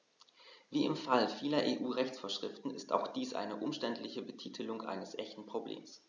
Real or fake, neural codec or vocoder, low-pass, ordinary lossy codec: real; none; 7.2 kHz; none